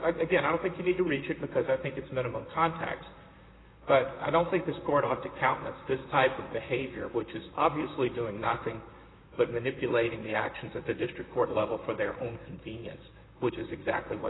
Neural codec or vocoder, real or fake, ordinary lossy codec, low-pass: vocoder, 44.1 kHz, 128 mel bands, Pupu-Vocoder; fake; AAC, 16 kbps; 7.2 kHz